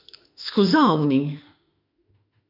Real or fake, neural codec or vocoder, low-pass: fake; autoencoder, 48 kHz, 32 numbers a frame, DAC-VAE, trained on Japanese speech; 5.4 kHz